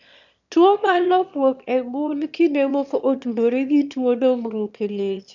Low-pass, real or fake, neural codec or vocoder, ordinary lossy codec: 7.2 kHz; fake; autoencoder, 22.05 kHz, a latent of 192 numbers a frame, VITS, trained on one speaker; none